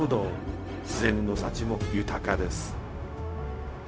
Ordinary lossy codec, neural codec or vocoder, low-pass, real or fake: none; codec, 16 kHz, 0.4 kbps, LongCat-Audio-Codec; none; fake